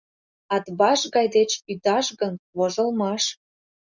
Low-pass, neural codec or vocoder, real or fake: 7.2 kHz; none; real